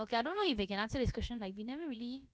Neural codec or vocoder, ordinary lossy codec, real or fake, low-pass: codec, 16 kHz, about 1 kbps, DyCAST, with the encoder's durations; none; fake; none